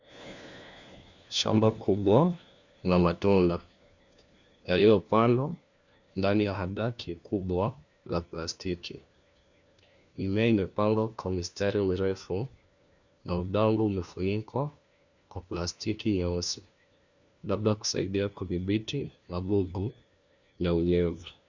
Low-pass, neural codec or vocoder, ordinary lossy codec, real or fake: 7.2 kHz; codec, 16 kHz, 1 kbps, FunCodec, trained on LibriTTS, 50 frames a second; Opus, 64 kbps; fake